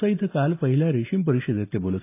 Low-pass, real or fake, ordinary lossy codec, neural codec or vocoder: 3.6 kHz; real; AAC, 24 kbps; none